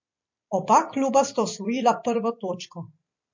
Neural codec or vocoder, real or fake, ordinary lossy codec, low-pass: vocoder, 44.1 kHz, 128 mel bands every 256 samples, BigVGAN v2; fake; MP3, 48 kbps; 7.2 kHz